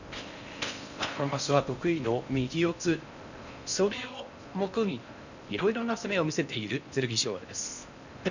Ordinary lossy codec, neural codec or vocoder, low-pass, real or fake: none; codec, 16 kHz in and 24 kHz out, 0.6 kbps, FocalCodec, streaming, 4096 codes; 7.2 kHz; fake